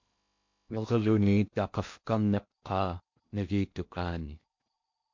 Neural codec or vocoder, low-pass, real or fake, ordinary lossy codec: codec, 16 kHz in and 24 kHz out, 0.6 kbps, FocalCodec, streaming, 2048 codes; 7.2 kHz; fake; MP3, 48 kbps